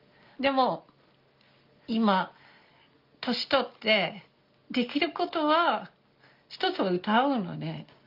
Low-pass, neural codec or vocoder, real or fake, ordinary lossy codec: 5.4 kHz; none; real; Opus, 32 kbps